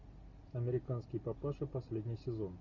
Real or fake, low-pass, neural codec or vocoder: real; 7.2 kHz; none